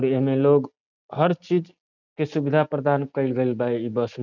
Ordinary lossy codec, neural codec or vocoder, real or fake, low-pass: none; none; real; 7.2 kHz